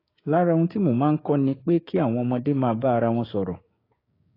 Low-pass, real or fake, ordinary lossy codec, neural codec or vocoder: 5.4 kHz; fake; AAC, 24 kbps; codec, 16 kHz, 6 kbps, DAC